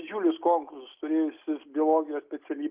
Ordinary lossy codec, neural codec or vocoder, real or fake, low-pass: Opus, 24 kbps; none; real; 3.6 kHz